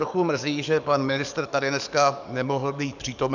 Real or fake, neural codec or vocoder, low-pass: fake; codec, 44.1 kHz, 7.8 kbps, DAC; 7.2 kHz